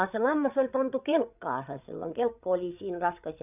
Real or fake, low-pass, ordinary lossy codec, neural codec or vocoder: fake; 3.6 kHz; none; codec, 16 kHz, 8 kbps, FreqCodec, larger model